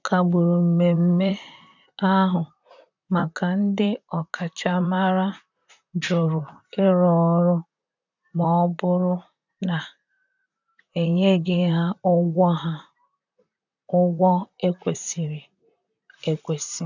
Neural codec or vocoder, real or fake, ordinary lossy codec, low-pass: vocoder, 44.1 kHz, 128 mel bands, Pupu-Vocoder; fake; none; 7.2 kHz